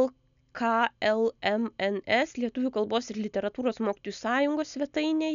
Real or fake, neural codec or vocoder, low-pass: real; none; 7.2 kHz